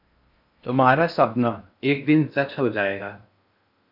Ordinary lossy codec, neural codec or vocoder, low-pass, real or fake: AAC, 48 kbps; codec, 16 kHz in and 24 kHz out, 0.6 kbps, FocalCodec, streaming, 4096 codes; 5.4 kHz; fake